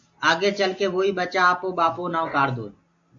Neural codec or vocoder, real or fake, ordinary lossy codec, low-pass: none; real; MP3, 48 kbps; 7.2 kHz